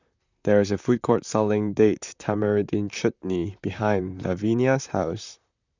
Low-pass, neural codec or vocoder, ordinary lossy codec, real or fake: 7.2 kHz; vocoder, 44.1 kHz, 128 mel bands, Pupu-Vocoder; none; fake